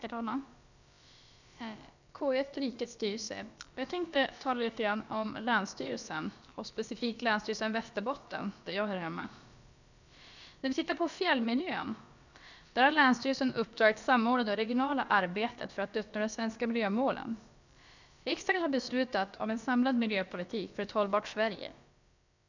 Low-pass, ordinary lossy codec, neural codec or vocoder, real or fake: 7.2 kHz; none; codec, 16 kHz, about 1 kbps, DyCAST, with the encoder's durations; fake